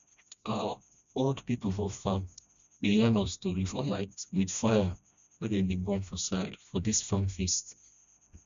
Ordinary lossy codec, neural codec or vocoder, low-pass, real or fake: none; codec, 16 kHz, 1 kbps, FreqCodec, smaller model; 7.2 kHz; fake